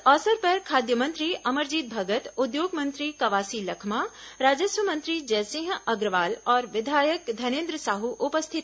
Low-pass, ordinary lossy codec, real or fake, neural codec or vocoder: none; none; real; none